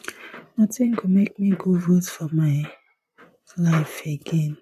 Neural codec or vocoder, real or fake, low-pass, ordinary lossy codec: vocoder, 44.1 kHz, 128 mel bands, Pupu-Vocoder; fake; 14.4 kHz; MP3, 64 kbps